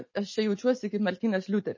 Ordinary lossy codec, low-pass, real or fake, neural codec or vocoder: MP3, 32 kbps; 7.2 kHz; real; none